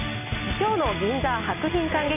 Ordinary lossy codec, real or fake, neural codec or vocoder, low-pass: none; real; none; 3.6 kHz